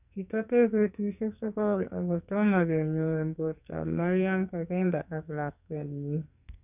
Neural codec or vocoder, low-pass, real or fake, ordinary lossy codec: codec, 44.1 kHz, 2.6 kbps, SNAC; 3.6 kHz; fake; none